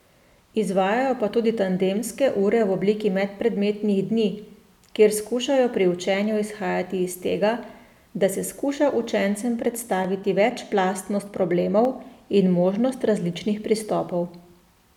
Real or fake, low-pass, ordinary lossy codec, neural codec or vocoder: real; 19.8 kHz; none; none